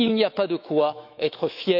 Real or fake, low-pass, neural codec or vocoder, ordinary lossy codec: fake; 5.4 kHz; autoencoder, 48 kHz, 128 numbers a frame, DAC-VAE, trained on Japanese speech; none